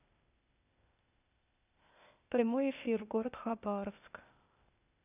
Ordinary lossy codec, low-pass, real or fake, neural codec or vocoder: none; 3.6 kHz; fake; codec, 16 kHz, 0.8 kbps, ZipCodec